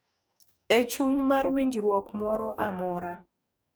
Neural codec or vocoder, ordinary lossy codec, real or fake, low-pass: codec, 44.1 kHz, 2.6 kbps, DAC; none; fake; none